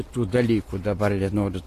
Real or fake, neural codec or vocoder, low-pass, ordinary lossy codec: real; none; 14.4 kHz; AAC, 48 kbps